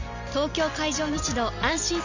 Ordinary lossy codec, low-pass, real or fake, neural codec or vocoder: none; 7.2 kHz; real; none